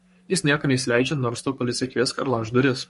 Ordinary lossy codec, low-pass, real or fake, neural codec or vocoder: MP3, 48 kbps; 14.4 kHz; fake; codec, 44.1 kHz, 3.4 kbps, Pupu-Codec